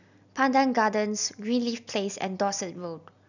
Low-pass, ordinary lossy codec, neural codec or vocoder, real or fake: 7.2 kHz; none; none; real